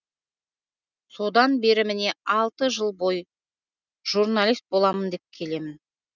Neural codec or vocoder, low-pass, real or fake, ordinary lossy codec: none; 7.2 kHz; real; none